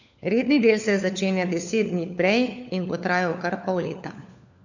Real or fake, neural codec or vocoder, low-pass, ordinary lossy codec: fake; codec, 16 kHz, 4 kbps, FunCodec, trained on LibriTTS, 50 frames a second; 7.2 kHz; none